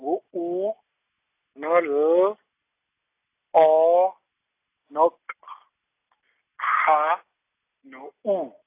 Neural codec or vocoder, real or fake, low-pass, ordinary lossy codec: none; real; 3.6 kHz; AAC, 24 kbps